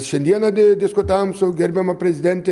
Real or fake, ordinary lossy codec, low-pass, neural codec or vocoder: real; Opus, 32 kbps; 10.8 kHz; none